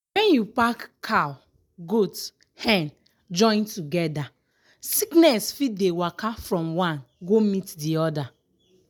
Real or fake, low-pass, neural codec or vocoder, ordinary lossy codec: real; none; none; none